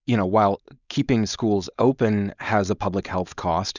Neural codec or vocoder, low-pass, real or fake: codec, 16 kHz, 4.8 kbps, FACodec; 7.2 kHz; fake